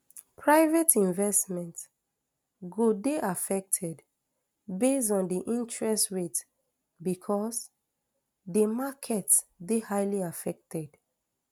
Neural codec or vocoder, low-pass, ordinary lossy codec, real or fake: none; none; none; real